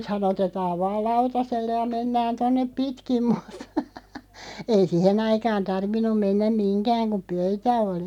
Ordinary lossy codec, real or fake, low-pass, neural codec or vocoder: none; real; 19.8 kHz; none